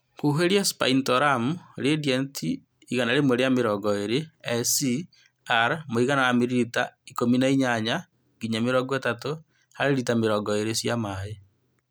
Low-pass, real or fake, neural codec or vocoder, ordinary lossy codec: none; real; none; none